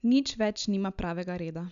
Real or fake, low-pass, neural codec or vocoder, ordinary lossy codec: real; 7.2 kHz; none; none